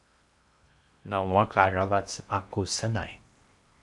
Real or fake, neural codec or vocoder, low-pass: fake; codec, 16 kHz in and 24 kHz out, 0.8 kbps, FocalCodec, streaming, 65536 codes; 10.8 kHz